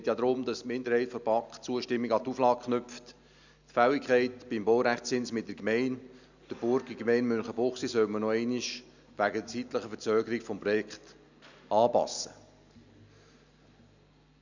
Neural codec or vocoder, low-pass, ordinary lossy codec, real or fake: none; 7.2 kHz; none; real